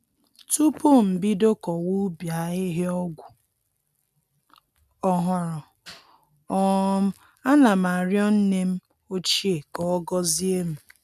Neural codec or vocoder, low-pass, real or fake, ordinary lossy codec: none; 14.4 kHz; real; none